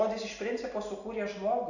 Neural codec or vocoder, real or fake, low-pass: none; real; 7.2 kHz